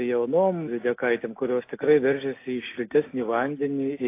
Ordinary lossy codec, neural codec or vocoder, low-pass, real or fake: AAC, 24 kbps; none; 3.6 kHz; real